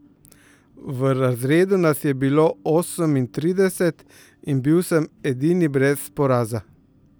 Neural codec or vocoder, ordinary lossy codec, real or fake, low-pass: none; none; real; none